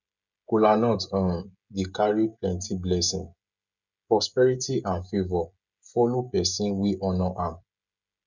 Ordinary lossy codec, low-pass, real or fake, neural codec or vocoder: none; 7.2 kHz; fake; codec, 16 kHz, 16 kbps, FreqCodec, smaller model